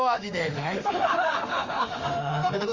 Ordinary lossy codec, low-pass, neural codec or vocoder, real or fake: Opus, 32 kbps; 7.2 kHz; autoencoder, 48 kHz, 32 numbers a frame, DAC-VAE, trained on Japanese speech; fake